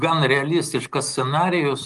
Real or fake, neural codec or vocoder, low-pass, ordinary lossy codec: real; none; 10.8 kHz; Opus, 24 kbps